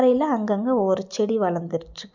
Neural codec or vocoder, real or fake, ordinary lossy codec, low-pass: none; real; none; 7.2 kHz